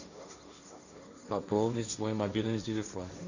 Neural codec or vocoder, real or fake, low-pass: codec, 16 kHz, 1.1 kbps, Voila-Tokenizer; fake; 7.2 kHz